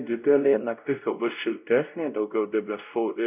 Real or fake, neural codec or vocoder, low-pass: fake; codec, 16 kHz, 0.5 kbps, X-Codec, WavLM features, trained on Multilingual LibriSpeech; 3.6 kHz